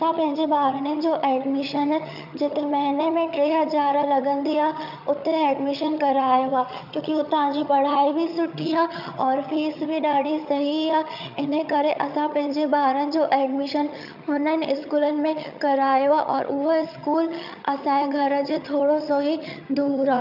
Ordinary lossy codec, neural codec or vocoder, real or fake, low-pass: none; vocoder, 22.05 kHz, 80 mel bands, HiFi-GAN; fake; 5.4 kHz